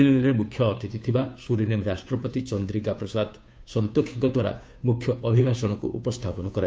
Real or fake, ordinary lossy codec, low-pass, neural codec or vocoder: fake; none; none; codec, 16 kHz, 2 kbps, FunCodec, trained on Chinese and English, 25 frames a second